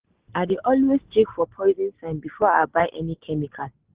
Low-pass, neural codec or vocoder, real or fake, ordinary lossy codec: 3.6 kHz; none; real; Opus, 16 kbps